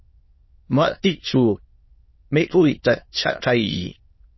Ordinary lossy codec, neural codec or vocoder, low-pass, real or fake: MP3, 24 kbps; autoencoder, 22.05 kHz, a latent of 192 numbers a frame, VITS, trained on many speakers; 7.2 kHz; fake